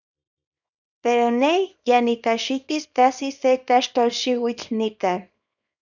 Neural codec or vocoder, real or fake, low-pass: codec, 24 kHz, 0.9 kbps, WavTokenizer, small release; fake; 7.2 kHz